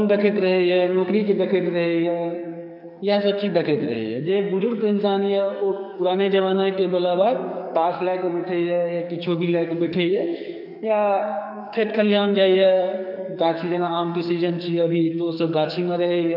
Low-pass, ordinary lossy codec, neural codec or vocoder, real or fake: 5.4 kHz; none; codec, 44.1 kHz, 2.6 kbps, SNAC; fake